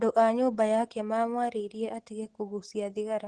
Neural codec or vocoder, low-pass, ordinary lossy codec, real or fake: none; 10.8 kHz; Opus, 16 kbps; real